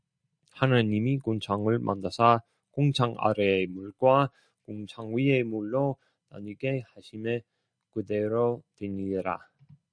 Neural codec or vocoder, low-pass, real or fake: none; 9.9 kHz; real